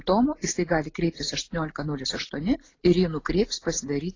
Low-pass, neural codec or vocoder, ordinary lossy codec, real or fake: 7.2 kHz; none; AAC, 32 kbps; real